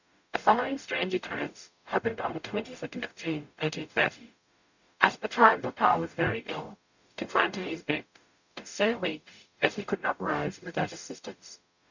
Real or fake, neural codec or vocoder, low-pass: fake; codec, 44.1 kHz, 0.9 kbps, DAC; 7.2 kHz